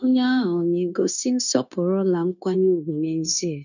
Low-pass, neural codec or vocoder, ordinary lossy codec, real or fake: 7.2 kHz; codec, 16 kHz, 0.9 kbps, LongCat-Audio-Codec; none; fake